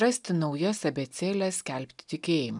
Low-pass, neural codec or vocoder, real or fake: 10.8 kHz; none; real